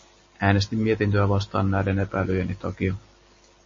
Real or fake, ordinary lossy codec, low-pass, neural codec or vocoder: real; MP3, 32 kbps; 7.2 kHz; none